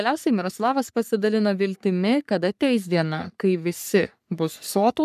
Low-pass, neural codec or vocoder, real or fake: 14.4 kHz; codec, 44.1 kHz, 3.4 kbps, Pupu-Codec; fake